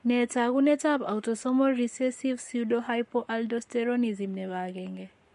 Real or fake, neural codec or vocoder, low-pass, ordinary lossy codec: fake; codec, 44.1 kHz, 7.8 kbps, Pupu-Codec; 14.4 kHz; MP3, 48 kbps